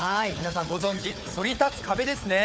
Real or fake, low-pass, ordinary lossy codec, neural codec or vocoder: fake; none; none; codec, 16 kHz, 4 kbps, FunCodec, trained on Chinese and English, 50 frames a second